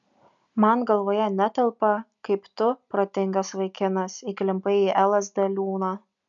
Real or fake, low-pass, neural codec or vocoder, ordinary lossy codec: real; 7.2 kHz; none; MP3, 96 kbps